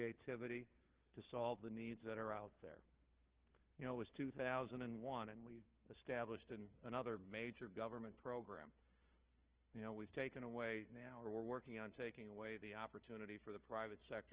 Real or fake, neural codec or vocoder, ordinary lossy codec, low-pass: fake; codec, 16 kHz, 4 kbps, FunCodec, trained on Chinese and English, 50 frames a second; Opus, 16 kbps; 3.6 kHz